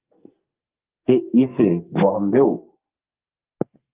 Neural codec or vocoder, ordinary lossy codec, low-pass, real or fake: codec, 44.1 kHz, 2.6 kbps, SNAC; Opus, 32 kbps; 3.6 kHz; fake